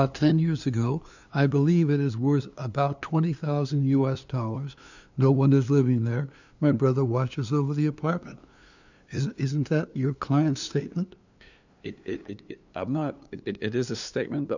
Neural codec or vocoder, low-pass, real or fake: codec, 16 kHz, 2 kbps, FunCodec, trained on LibriTTS, 25 frames a second; 7.2 kHz; fake